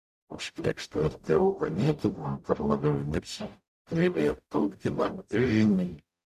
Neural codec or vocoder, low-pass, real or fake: codec, 44.1 kHz, 0.9 kbps, DAC; 14.4 kHz; fake